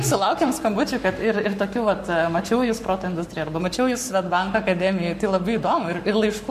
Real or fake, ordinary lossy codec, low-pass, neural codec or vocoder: fake; MP3, 64 kbps; 14.4 kHz; codec, 44.1 kHz, 7.8 kbps, Pupu-Codec